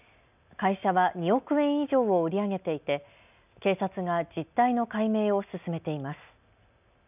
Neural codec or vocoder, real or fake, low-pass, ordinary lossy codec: none; real; 3.6 kHz; none